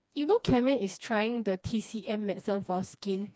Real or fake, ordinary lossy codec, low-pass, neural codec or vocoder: fake; none; none; codec, 16 kHz, 2 kbps, FreqCodec, smaller model